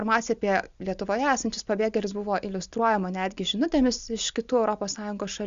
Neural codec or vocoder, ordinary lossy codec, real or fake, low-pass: none; Opus, 64 kbps; real; 7.2 kHz